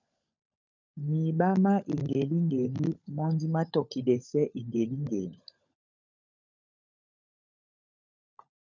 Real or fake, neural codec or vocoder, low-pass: fake; codec, 16 kHz, 16 kbps, FunCodec, trained on LibriTTS, 50 frames a second; 7.2 kHz